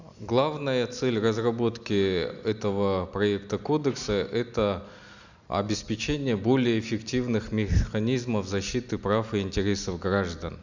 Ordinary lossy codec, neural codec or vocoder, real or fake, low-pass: none; none; real; 7.2 kHz